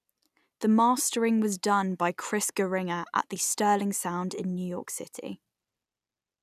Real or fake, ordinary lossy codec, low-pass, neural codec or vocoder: real; none; 14.4 kHz; none